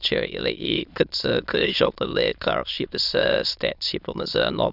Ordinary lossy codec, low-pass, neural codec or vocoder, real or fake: none; 5.4 kHz; autoencoder, 22.05 kHz, a latent of 192 numbers a frame, VITS, trained on many speakers; fake